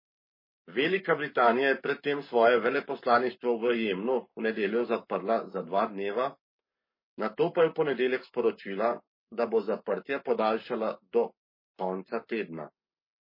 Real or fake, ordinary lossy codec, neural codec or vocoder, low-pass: fake; MP3, 24 kbps; codec, 44.1 kHz, 7.8 kbps, Pupu-Codec; 5.4 kHz